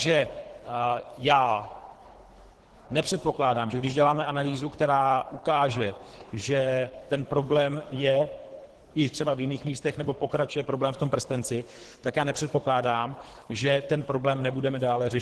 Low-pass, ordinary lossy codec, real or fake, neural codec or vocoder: 10.8 kHz; Opus, 16 kbps; fake; codec, 24 kHz, 3 kbps, HILCodec